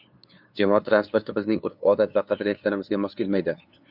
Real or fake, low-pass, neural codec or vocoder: fake; 5.4 kHz; codec, 16 kHz, 2 kbps, FunCodec, trained on LibriTTS, 25 frames a second